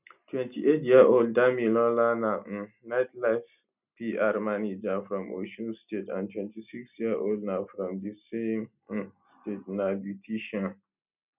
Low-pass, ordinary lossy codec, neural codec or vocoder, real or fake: 3.6 kHz; none; none; real